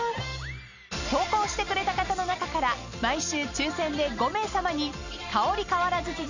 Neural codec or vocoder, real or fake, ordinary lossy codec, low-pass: none; real; none; 7.2 kHz